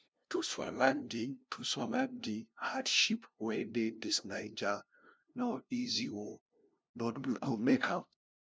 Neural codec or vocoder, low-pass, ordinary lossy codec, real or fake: codec, 16 kHz, 0.5 kbps, FunCodec, trained on LibriTTS, 25 frames a second; none; none; fake